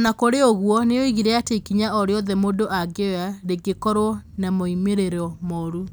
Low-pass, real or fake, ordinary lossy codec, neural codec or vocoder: none; real; none; none